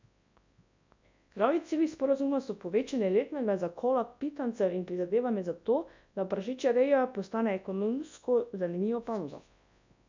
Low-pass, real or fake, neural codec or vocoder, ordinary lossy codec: 7.2 kHz; fake; codec, 24 kHz, 0.9 kbps, WavTokenizer, large speech release; MP3, 64 kbps